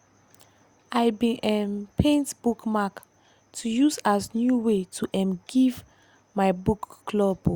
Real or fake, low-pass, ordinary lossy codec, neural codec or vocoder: real; 19.8 kHz; Opus, 64 kbps; none